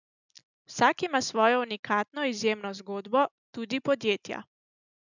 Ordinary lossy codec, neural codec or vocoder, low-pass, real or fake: none; none; 7.2 kHz; real